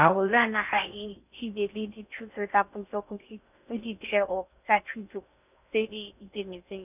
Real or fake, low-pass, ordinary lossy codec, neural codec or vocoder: fake; 3.6 kHz; none; codec, 16 kHz in and 24 kHz out, 0.6 kbps, FocalCodec, streaming, 4096 codes